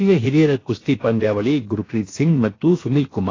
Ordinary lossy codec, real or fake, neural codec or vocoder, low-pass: AAC, 32 kbps; fake; codec, 16 kHz, about 1 kbps, DyCAST, with the encoder's durations; 7.2 kHz